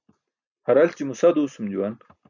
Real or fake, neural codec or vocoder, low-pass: real; none; 7.2 kHz